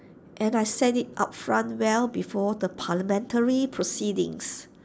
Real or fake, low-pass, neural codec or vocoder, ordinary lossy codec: real; none; none; none